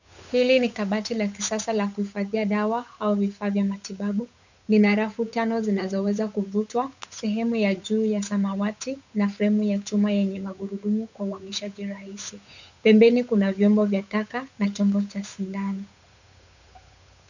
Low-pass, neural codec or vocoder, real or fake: 7.2 kHz; codec, 16 kHz, 8 kbps, FunCodec, trained on Chinese and English, 25 frames a second; fake